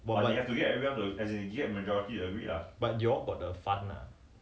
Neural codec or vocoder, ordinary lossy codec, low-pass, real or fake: none; none; none; real